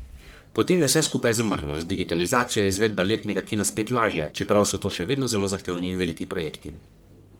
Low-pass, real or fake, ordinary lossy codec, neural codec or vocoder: none; fake; none; codec, 44.1 kHz, 1.7 kbps, Pupu-Codec